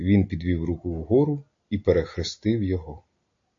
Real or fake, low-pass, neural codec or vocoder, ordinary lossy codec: real; 7.2 kHz; none; AAC, 48 kbps